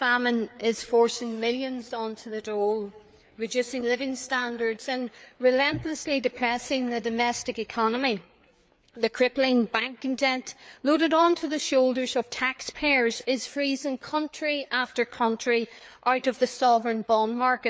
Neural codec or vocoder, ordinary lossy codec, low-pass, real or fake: codec, 16 kHz, 4 kbps, FreqCodec, larger model; none; none; fake